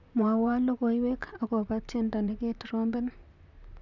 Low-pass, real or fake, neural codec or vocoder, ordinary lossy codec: 7.2 kHz; fake; vocoder, 44.1 kHz, 128 mel bands, Pupu-Vocoder; none